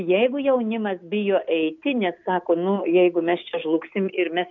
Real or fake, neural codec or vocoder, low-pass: real; none; 7.2 kHz